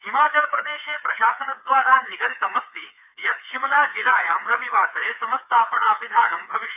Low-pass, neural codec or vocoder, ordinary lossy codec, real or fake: 3.6 kHz; codec, 16 kHz, 4 kbps, FreqCodec, smaller model; none; fake